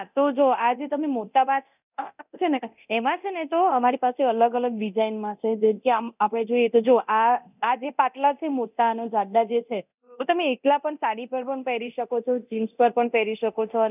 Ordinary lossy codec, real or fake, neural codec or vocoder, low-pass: none; fake; codec, 24 kHz, 0.9 kbps, DualCodec; 3.6 kHz